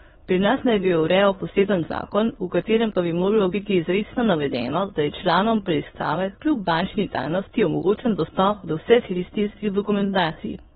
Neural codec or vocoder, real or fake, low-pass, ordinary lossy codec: autoencoder, 22.05 kHz, a latent of 192 numbers a frame, VITS, trained on many speakers; fake; 9.9 kHz; AAC, 16 kbps